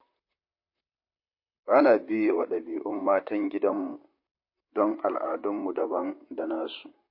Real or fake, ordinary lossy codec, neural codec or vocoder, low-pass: fake; MP3, 32 kbps; vocoder, 44.1 kHz, 128 mel bands, Pupu-Vocoder; 5.4 kHz